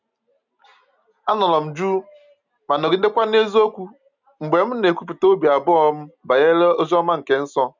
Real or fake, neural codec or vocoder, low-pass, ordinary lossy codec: real; none; 7.2 kHz; none